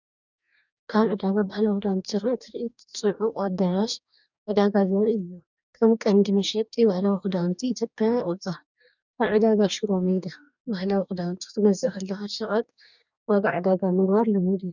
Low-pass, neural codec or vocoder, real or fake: 7.2 kHz; codec, 44.1 kHz, 2.6 kbps, DAC; fake